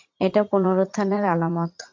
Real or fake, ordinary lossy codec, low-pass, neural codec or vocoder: fake; MP3, 48 kbps; 7.2 kHz; vocoder, 44.1 kHz, 80 mel bands, Vocos